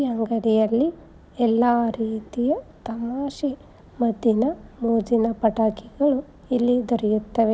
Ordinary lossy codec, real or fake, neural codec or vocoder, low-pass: none; real; none; none